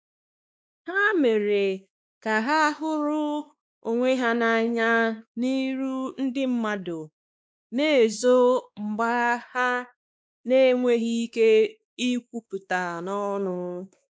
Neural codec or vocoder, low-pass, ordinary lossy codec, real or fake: codec, 16 kHz, 2 kbps, X-Codec, WavLM features, trained on Multilingual LibriSpeech; none; none; fake